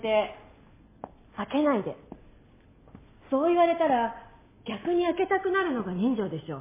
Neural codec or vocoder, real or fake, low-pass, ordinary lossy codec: none; real; 3.6 kHz; MP3, 16 kbps